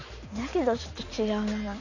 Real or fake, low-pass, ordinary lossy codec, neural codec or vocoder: real; 7.2 kHz; none; none